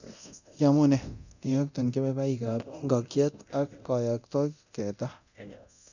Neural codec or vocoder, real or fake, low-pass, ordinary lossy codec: codec, 24 kHz, 0.9 kbps, DualCodec; fake; 7.2 kHz; none